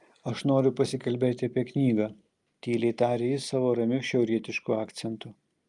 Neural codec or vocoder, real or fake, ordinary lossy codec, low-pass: none; real; Opus, 32 kbps; 10.8 kHz